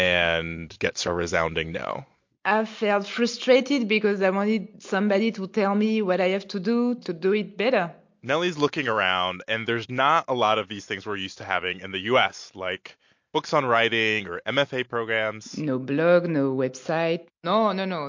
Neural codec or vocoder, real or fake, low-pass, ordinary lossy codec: none; real; 7.2 kHz; MP3, 48 kbps